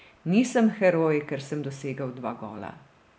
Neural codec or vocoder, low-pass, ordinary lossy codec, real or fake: none; none; none; real